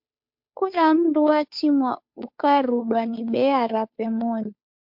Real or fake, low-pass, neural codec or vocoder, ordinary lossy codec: fake; 5.4 kHz; codec, 16 kHz, 2 kbps, FunCodec, trained on Chinese and English, 25 frames a second; MP3, 48 kbps